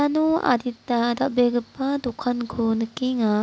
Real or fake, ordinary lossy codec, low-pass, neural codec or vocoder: real; none; none; none